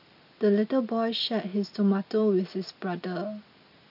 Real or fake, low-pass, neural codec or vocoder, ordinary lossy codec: real; 5.4 kHz; none; none